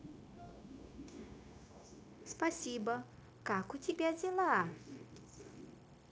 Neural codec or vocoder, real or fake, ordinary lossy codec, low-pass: codec, 16 kHz, 0.9 kbps, LongCat-Audio-Codec; fake; none; none